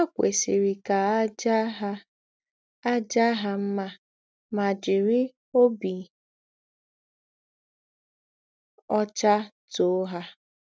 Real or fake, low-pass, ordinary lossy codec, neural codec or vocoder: real; none; none; none